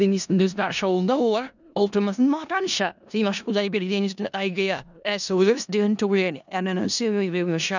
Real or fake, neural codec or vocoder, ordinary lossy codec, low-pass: fake; codec, 16 kHz in and 24 kHz out, 0.4 kbps, LongCat-Audio-Codec, four codebook decoder; none; 7.2 kHz